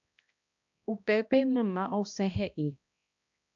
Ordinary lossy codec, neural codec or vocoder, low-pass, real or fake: AAC, 64 kbps; codec, 16 kHz, 1 kbps, X-Codec, HuBERT features, trained on balanced general audio; 7.2 kHz; fake